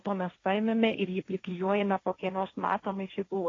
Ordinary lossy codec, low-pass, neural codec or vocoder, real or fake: AAC, 32 kbps; 7.2 kHz; codec, 16 kHz, 1.1 kbps, Voila-Tokenizer; fake